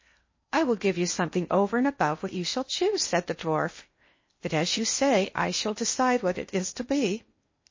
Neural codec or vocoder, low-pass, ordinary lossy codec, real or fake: codec, 16 kHz in and 24 kHz out, 0.6 kbps, FocalCodec, streaming, 4096 codes; 7.2 kHz; MP3, 32 kbps; fake